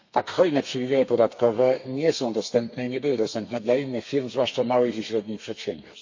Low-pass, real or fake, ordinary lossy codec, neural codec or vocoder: 7.2 kHz; fake; MP3, 48 kbps; codec, 32 kHz, 1.9 kbps, SNAC